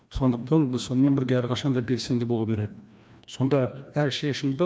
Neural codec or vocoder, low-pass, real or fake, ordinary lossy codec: codec, 16 kHz, 1 kbps, FreqCodec, larger model; none; fake; none